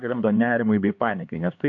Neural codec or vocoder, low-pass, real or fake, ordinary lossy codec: codec, 16 kHz, 2 kbps, X-Codec, HuBERT features, trained on LibriSpeech; 7.2 kHz; fake; AAC, 64 kbps